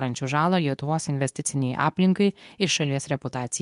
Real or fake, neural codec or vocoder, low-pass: fake; codec, 24 kHz, 0.9 kbps, WavTokenizer, medium speech release version 2; 10.8 kHz